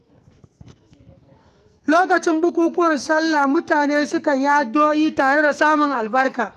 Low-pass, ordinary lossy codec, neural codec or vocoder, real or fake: 14.4 kHz; none; codec, 44.1 kHz, 2.6 kbps, SNAC; fake